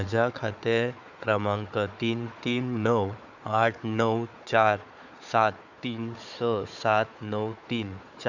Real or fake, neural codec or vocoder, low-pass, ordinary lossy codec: fake; codec, 16 kHz, 4 kbps, FunCodec, trained on Chinese and English, 50 frames a second; 7.2 kHz; none